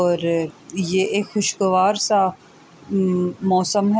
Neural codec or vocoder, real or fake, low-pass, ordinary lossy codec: none; real; none; none